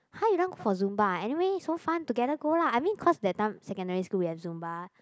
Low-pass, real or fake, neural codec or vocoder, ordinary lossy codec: none; real; none; none